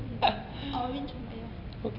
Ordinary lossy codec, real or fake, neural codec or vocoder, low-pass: none; real; none; 5.4 kHz